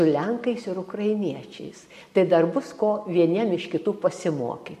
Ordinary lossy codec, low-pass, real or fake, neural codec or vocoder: MP3, 64 kbps; 14.4 kHz; real; none